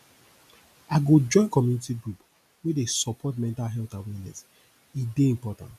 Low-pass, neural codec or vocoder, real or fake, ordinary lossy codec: 14.4 kHz; none; real; none